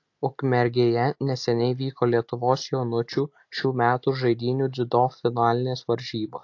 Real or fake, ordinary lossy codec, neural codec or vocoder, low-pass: real; AAC, 48 kbps; none; 7.2 kHz